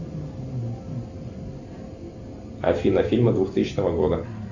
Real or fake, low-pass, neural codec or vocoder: real; 7.2 kHz; none